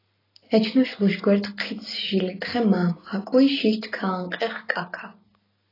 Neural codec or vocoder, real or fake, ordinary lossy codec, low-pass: none; real; AAC, 24 kbps; 5.4 kHz